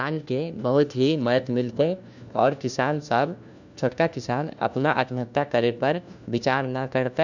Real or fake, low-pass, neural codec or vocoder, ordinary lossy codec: fake; 7.2 kHz; codec, 16 kHz, 1 kbps, FunCodec, trained on LibriTTS, 50 frames a second; none